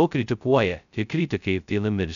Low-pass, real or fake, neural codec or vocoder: 7.2 kHz; fake; codec, 16 kHz, 0.2 kbps, FocalCodec